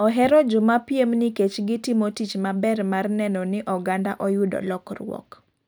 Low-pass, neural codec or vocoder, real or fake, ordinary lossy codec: none; none; real; none